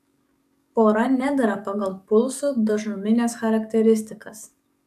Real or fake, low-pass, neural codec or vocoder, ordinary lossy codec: fake; 14.4 kHz; codec, 44.1 kHz, 7.8 kbps, DAC; AAC, 96 kbps